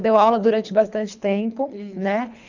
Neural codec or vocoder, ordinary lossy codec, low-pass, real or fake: codec, 24 kHz, 3 kbps, HILCodec; none; 7.2 kHz; fake